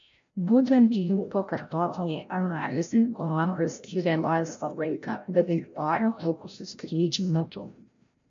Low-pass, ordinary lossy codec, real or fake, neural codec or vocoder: 7.2 kHz; MP3, 64 kbps; fake; codec, 16 kHz, 0.5 kbps, FreqCodec, larger model